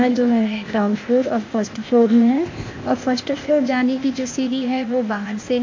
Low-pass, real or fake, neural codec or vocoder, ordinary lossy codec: 7.2 kHz; fake; codec, 16 kHz, 0.8 kbps, ZipCodec; MP3, 48 kbps